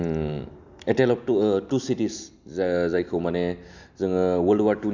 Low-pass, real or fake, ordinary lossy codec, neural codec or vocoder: 7.2 kHz; real; none; none